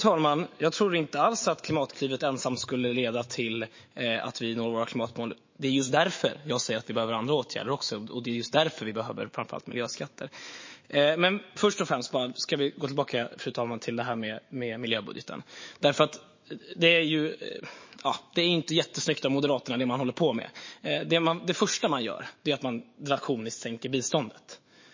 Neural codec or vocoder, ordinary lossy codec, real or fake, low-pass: autoencoder, 48 kHz, 128 numbers a frame, DAC-VAE, trained on Japanese speech; MP3, 32 kbps; fake; 7.2 kHz